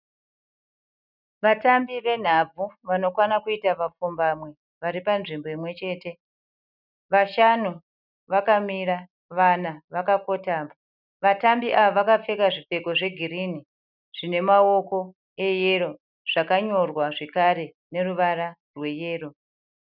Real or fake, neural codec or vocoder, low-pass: real; none; 5.4 kHz